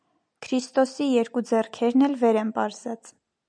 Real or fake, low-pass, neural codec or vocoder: real; 9.9 kHz; none